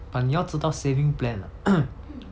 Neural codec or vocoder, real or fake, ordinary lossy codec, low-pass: none; real; none; none